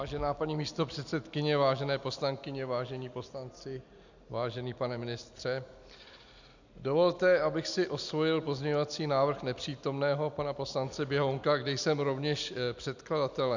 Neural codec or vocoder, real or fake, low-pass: none; real; 7.2 kHz